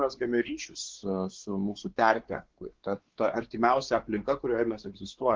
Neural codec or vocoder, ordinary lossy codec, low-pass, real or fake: codec, 16 kHz, 4 kbps, X-Codec, WavLM features, trained on Multilingual LibriSpeech; Opus, 16 kbps; 7.2 kHz; fake